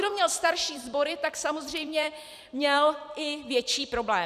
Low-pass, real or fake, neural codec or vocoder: 14.4 kHz; fake; vocoder, 44.1 kHz, 128 mel bands every 256 samples, BigVGAN v2